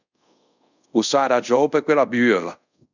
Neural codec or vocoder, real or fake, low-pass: codec, 24 kHz, 0.5 kbps, DualCodec; fake; 7.2 kHz